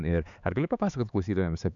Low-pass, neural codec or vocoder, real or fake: 7.2 kHz; codec, 16 kHz, 4 kbps, X-Codec, HuBERT features, trained on LibriSpeech; fake